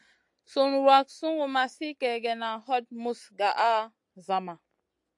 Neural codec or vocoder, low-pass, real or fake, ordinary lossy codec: none; 10.8 kHz; real; AAC, 64 kbps